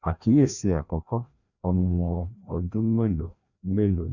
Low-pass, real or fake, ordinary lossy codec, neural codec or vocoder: 7.2 kHz; fake; none; codec, 16 kHz, 1 kbps, FreqCodec, larger model